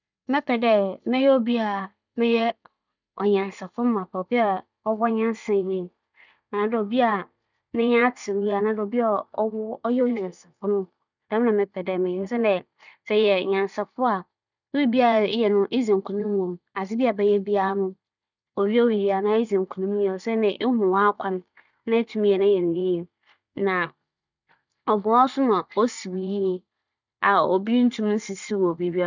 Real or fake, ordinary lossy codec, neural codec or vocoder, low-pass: fake; none; vocoder, 44.1 kHz, 80 mel bands, Vocos; 7.2 kHz